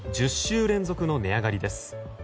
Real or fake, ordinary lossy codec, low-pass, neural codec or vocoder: real; none; none; none